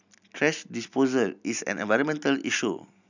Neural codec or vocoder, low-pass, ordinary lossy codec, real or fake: none; 7.2 kHz; none; real